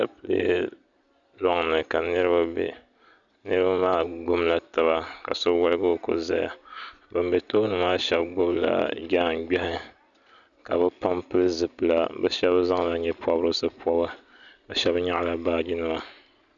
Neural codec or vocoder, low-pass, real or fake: none; 7.2 kHz; real